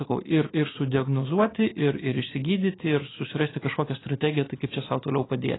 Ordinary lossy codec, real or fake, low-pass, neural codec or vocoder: AAC, 16 kbps; real; 7.2 kHz; none